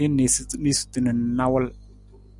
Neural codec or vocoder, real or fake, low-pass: none; real; 10.8 kHz